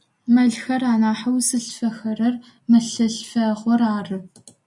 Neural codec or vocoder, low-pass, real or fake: none; 10.8 kHz; real